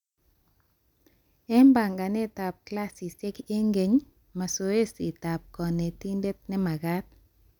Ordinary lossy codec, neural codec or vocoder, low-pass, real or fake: none; none; 19.8 kHz; real